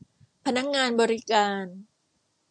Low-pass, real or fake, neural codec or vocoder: 9.9 kHz; real; none